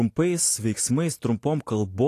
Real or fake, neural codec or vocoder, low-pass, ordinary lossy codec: real; none; 14.4 kHz; AAC, 48 kbps